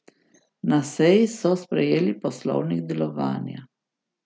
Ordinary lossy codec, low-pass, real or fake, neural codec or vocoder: none; none; real; none